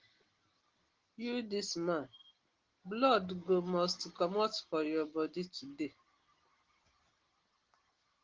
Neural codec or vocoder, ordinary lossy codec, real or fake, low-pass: none; Opus, 16 kbps; real; 7.2 kHz